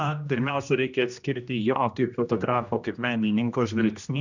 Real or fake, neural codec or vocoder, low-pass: fake; codec, 16 kHz, 1 kbps, X-Codec, HuBERT features, trained on general audio; 7.2 kHz